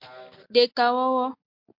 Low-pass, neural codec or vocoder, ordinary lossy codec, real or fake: 5.4 kHz; none; MP3, 48 kbps; real